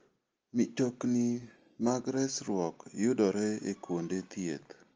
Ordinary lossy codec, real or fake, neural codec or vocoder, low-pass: Opus, 24 kbps; real; none; 7.2 kHz